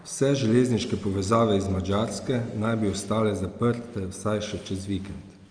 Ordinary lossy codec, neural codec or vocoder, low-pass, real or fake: Opus, 32 kbps; none; 9.9 kHz; real